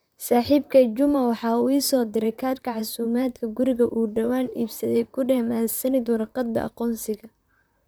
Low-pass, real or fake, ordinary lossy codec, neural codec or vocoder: none; fake; none; vocoder, 44.1 kHz, 128 mel bands, Pupu-Vocoder